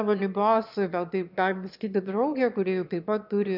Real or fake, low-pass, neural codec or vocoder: fake; 5.4 kHz; autoencoder, 22.05 kHz, a latent of 192 numbers a frame, VITS, trained on one speaker